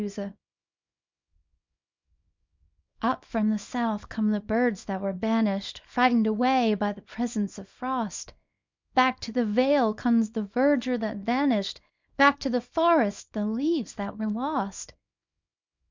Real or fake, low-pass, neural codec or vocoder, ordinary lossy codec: fake; 7.2 kHz; codec, 24 kHz, 0.9 kbps, WavTokenizer, small release; MP3, 64 kbps